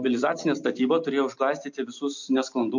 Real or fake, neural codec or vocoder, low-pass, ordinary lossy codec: real; none; 7.2 kHz; MP3, 64 kbps